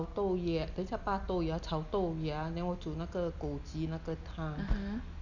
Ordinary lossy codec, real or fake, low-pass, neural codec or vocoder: none; real; 7.2 kHz; none